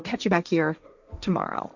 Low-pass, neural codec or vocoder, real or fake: 7.2 kHz; codec, 16 kHz, 1.1 kbps, Voila-Tokenizer; fake